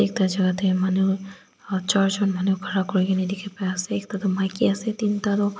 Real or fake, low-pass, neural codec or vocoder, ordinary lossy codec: real; none; none; none